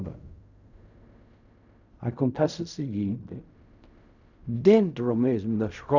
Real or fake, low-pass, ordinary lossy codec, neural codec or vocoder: fake; 7.2 kHz; none; codec, 16 kHz in and 24 kHz out, 0.4 kbps, LongCat-Audio-Codec, fine tuned four codebook decoder